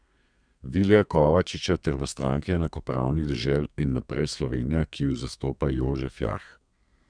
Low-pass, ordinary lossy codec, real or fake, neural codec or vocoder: 9.9 kHz; none; fake; codec, 32 kHz, 1.9 kbps, SNAC